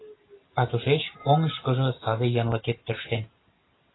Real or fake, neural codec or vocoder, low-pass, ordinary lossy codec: real; none; 7.2 kHz; AAC, 16 kbps